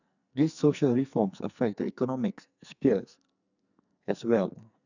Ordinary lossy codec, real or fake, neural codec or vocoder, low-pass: none; fake; codec, 44.1 kHz, 2.6 kbps, SNAC; 7.2 kHz